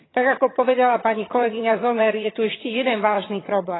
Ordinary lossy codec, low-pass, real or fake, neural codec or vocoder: AAC, 16 kbps; 7.2 kHz; fake; vocoder, 22.05 kHz, 80 mel bands, HiFi-GAN